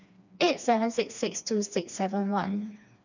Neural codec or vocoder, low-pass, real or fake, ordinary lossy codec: codec, 16 kHz, 2 kbps, FreqCodec, smaller model; 7.2 kHz; fake; none